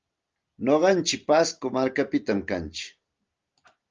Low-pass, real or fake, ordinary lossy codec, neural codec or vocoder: 7.2 kHz; real; Opus, 32 kbps; none